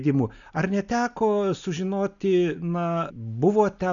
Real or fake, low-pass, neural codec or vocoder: real; 7.2 kHz; none